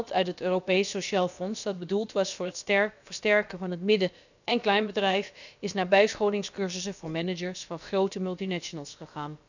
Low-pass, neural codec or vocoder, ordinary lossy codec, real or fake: 7.2 kHz; codec, 16 kHz, about 1 kbps, DyCAST, with the encoder's durations; none; fake